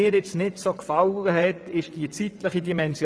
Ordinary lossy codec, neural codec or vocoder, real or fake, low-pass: none; vocoder, 22.05 kHz, 80 mel bands, WaveNeXt; fake; none